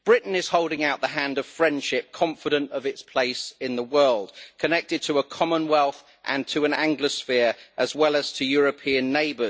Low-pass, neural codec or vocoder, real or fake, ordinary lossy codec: none; none; real; none